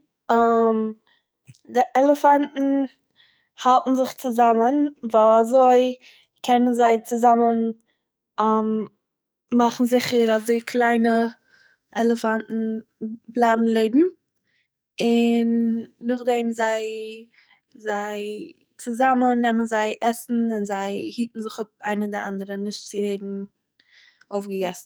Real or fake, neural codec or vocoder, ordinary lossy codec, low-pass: fake; codec, 44.1 kHz, 2.6 kbps, SNAC; none; none